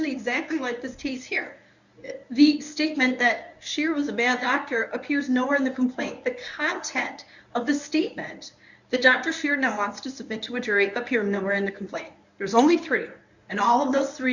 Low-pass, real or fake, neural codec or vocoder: 7.2 kHz; fake; codec, 24 kHz, 0.9 kbps, WavTokenizer, medium speech release version 1